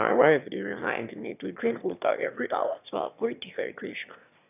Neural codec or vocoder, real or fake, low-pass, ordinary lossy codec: autoencoder, 22.05 kHz, a latent of 192 numbers a frame, VITS, trained on one speaker; fake; 3.6 kHz; none